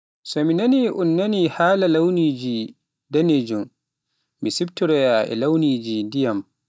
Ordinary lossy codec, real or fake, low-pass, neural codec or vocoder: none; real; none; none